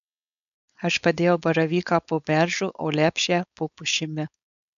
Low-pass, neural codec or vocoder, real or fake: 7.2 kHz; codec, 16 kHz, 4.8 kbps, FACodec; fake